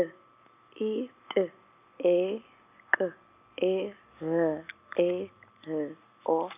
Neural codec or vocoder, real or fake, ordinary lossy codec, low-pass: none; real; none; 3.6 kHz